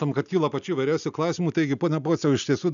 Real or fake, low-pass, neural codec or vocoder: real; 7.2 kHz; none